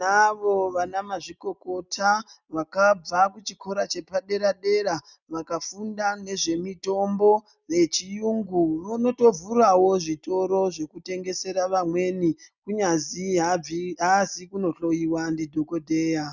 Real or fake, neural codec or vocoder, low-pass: real; none; 7.2 kHz